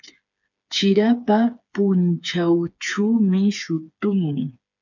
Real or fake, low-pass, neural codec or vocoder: fake; 7.2 kHz; codec, 16 kHz, 4 kbps, FreqCodec, smaller model